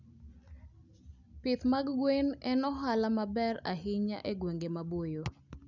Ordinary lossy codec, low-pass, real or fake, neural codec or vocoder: none; 7.2 kHz; real; none